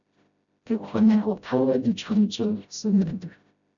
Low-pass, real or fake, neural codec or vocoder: 7.2 kHz; fake; codec, 16 kHz, 0.5 kbps, FreqCodec, smaller model